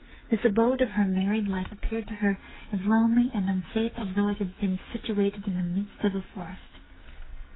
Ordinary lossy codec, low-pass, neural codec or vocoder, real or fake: AAC, 16 kbps; 7.2 kHz; codec, 44.1 kHz, 2.6 kbps, SNAC; fake